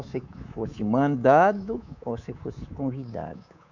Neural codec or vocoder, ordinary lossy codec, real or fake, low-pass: codec, 24 kHz, 3.1 kbps, DualCodec; none; fake; 7.2 kHz